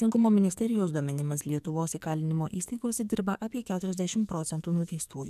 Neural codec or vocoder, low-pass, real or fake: codec, 44.1 kHz, 2.6 kbps, SNAC; 14.4 kHz; fake